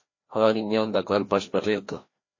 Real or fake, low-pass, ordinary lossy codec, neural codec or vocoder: fake; 7.2 kHz; MP3, 32 kbps; codec, 16 kHz, 1 kbps, FreqCodec, larger model